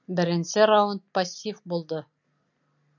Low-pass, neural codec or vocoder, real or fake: 7.2 kHz; none; real